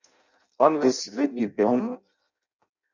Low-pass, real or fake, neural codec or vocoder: 7.2 kHz; fake; codec, 16 kHz in and 24 kHz out, 0.6 kbps, FireRedTTS-2 codec